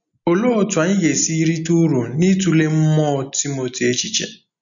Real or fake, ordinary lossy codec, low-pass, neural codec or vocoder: real; none; 7.2 kHz; none